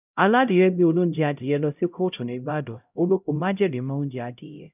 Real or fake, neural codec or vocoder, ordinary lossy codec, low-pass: fake; codec, 16 kHz, 0.5 kbps, X-Codec, HuBERT features, trained on LibriSpeech; none; 3.6 kHz